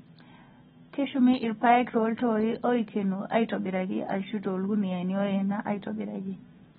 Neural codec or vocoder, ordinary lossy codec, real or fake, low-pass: none; AAC, 16 kbps; real; 19.8 kHz